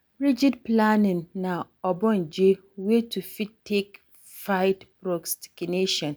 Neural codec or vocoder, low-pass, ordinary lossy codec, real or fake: none; none; none; real